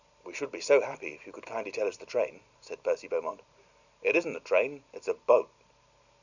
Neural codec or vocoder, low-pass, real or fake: none; 7.2 kHz; real